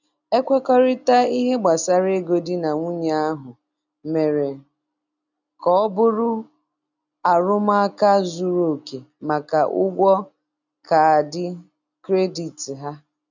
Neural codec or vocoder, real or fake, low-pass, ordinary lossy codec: none; real; 7.2 kHz; none